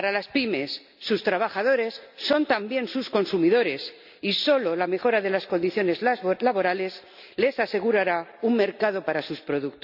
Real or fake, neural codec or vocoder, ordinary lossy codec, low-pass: real; none; none; 5.4 kHz